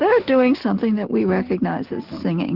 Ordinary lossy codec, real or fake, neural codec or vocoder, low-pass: Opus, 16 kbps; real; none; 5.4 kHz